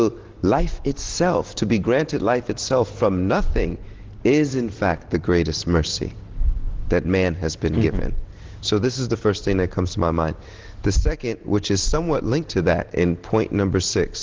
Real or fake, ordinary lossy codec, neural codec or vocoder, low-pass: real; Opus, 16 kbps; none; 7.2 kHz